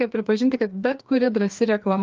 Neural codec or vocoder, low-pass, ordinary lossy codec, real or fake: codec, 16 kHz, 2 kbps, FreqCodec, larger model; 7.2 kHz; Opus, 32 kbps; fake